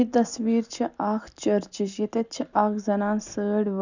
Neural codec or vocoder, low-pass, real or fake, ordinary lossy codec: none; 7.2 kHz; real; none